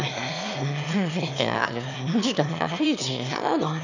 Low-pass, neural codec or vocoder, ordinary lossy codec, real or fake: 7.2 kHz; autoencoder, 22.05 kHz, a latent of 192 numbers a frame, VITS, trained on one speaker; none; fake